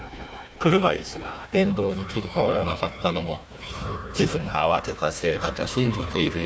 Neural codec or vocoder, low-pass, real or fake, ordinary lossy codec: codec, 16 kHz, 1 kbps, FunCodec, trained on Chinese and English, 50 frames a second; none; fake; none